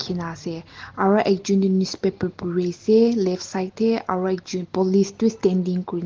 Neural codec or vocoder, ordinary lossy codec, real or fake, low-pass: none; Opus, 16 kbps; real; 7.2 kHz